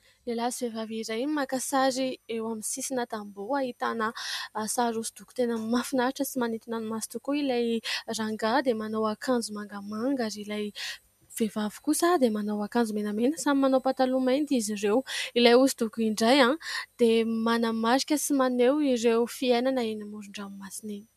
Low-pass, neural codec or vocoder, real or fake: 14.4 kHz; none; real